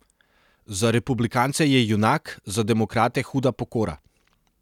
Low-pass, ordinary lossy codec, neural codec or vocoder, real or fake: 19.8 kHz; none; none; real